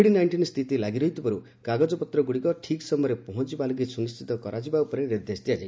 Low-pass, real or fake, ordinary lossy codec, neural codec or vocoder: none; real; none; none